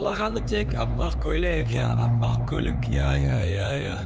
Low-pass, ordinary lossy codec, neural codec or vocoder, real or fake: none; none; codec, 16 kHz, 4 kbps, X-Codec, HuBERT features, trained on LibriSpeech; fake